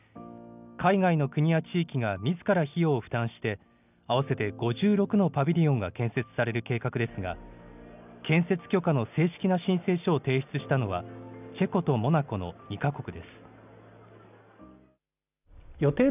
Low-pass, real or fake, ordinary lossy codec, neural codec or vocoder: 3.6 kHz; real; none; none